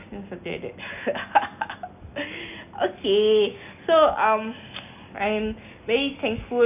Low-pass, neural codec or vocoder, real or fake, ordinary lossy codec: 3.6 kHz; none; real; none